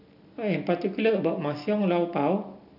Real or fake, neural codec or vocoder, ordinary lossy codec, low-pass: real; none; none; 5.4 kHz